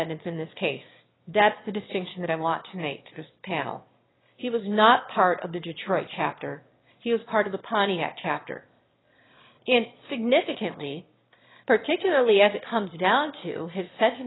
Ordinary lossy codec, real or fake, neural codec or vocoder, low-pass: AAC, 16 kbps; fake; autoencoder, 22.05 kHz, a latent of 192 numbers a frame, VITS, trained on one speaker; 7.2 kHz